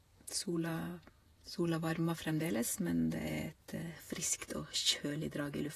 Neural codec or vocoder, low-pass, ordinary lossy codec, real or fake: vocoder, 48 kHz, 128 mel bands, Vocos; 14.4 kHz; AAC, 48 kbps; fake